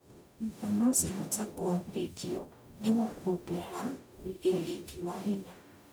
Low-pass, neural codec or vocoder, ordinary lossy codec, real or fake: none; codec, 44.1 kHz, 0.9 kbps, DAC; none; fake